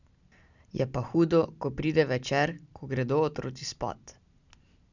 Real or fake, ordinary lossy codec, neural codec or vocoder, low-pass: real; none; none; 7.2 kHz